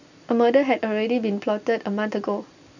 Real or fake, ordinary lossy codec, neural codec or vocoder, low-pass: real; none; none; 7.2 kHz